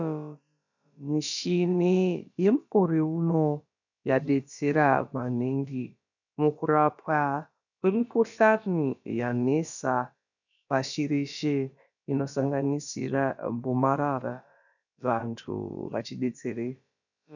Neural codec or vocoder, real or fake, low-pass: codec, 16 kHz, about 1 kbps, DyCAST, with the encoder's durations; fake; 7.2 kHz